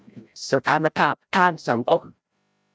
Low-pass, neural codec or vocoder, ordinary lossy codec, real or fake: none; codec, 16 kHz, 0.5 kbps, FreqCodec, larger model; none; fake